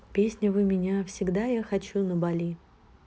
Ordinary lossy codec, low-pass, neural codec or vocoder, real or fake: none; none; none; real